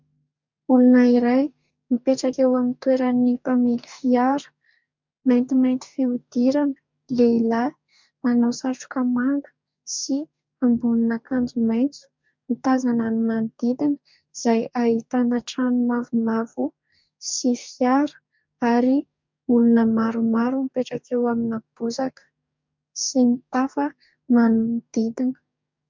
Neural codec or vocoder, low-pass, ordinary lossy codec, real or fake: codec, 44.1 kHz, 2.6 kbps, DAC; 7.2 kHz; MP3, 64 kbps; fake